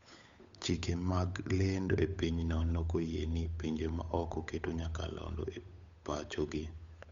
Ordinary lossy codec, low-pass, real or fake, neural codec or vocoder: Opus, 64 kbps; 7.2 kHz; fake; codec, 16 kHz, 8 kbps, FunCodec, trained on Chinese and English, 25 frames a second